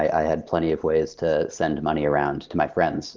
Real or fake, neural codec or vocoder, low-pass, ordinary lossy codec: real; none; 7.2 kHz; Opus, 32 kbps